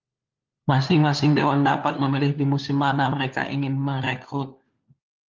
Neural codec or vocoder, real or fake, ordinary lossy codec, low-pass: codec, 16 kHz, 4 kbps, FunCodec, trained on LibriTTS, 50 frames a second; fake; Opus, 24 kbps; 7.2 kHz